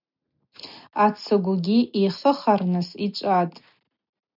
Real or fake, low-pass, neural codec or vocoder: real; 5.4 kHz; none